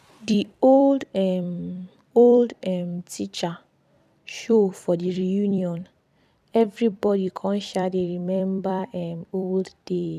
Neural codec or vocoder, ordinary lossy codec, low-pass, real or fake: vocoder, 44.1 kHz, 128 mel bands every 256 samples, BigVGAN v2; none; 14.4 kHz; fake